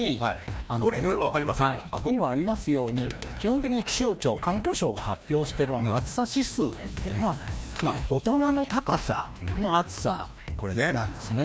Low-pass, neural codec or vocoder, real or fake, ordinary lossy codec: none; codec, 16 kHz, 1 kbps, FreqCodec, larger model; fake; none